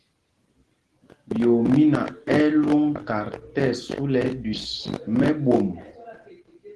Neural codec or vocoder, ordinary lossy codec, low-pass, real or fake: none; Opus, 16 kbps; 10.8 kHz; real